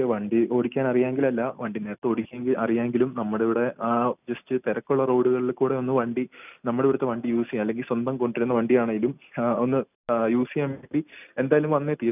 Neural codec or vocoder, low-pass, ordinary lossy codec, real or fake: none; 3.6 kHz; none; real